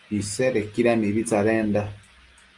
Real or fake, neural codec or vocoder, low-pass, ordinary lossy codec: real; none; 10.8 kHz; Opus, 32 kbps